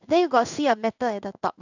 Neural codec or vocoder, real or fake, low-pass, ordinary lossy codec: codec, 16 kHz in and 24 kHz out, 1 kbps, XY-Tokenizer; fake; 7.2 kHz; none